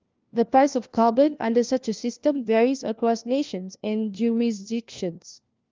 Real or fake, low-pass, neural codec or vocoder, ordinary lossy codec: fake; 7.2 kHz; codec, 16 kHz, 1 kbps, FunCodec, trained on LibriTTS, 50 frames a second; Opus, 24 kbps